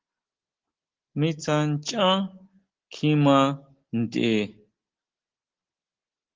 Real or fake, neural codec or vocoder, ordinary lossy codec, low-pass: real; none; Opus, 16 kbps; 7.2 kHz